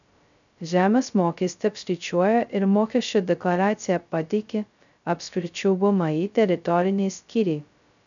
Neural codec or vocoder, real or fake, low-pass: codec, 16 kHz, 0.2 kbps, FocalCodec; fake; 7.2 kHz